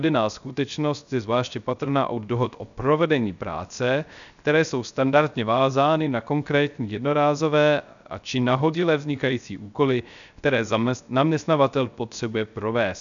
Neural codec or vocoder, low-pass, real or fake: codec, 16 kHz, 0.3 kbps, FocalCodec; 7.2 kHz; fake